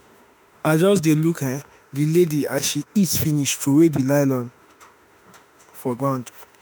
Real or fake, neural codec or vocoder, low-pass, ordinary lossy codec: fake; autoencoder, 48 kHz, 32 numbers a frame, DAC-VAE, trained on Japanese speech; none; none